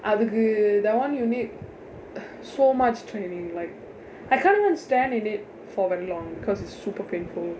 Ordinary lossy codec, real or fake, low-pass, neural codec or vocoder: none; real; none; none